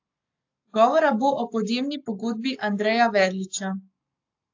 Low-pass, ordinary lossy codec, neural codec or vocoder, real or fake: 7.2 kHz; AAC, 48 kbps; codec, 44.1 kHz, 7.8 kbps, Pupu-Codec; fake